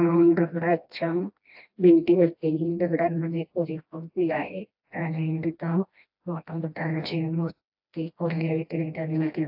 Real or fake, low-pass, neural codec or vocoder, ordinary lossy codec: fake; 5.4 kHz; codec, 16 kHz, 1 kbps, FreqCodec, smaller model; AAC, 48 kbps